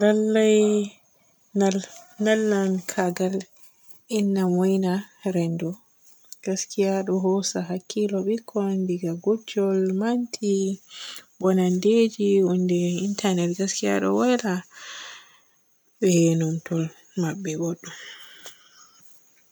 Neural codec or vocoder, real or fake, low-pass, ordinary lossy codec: none; real; none; none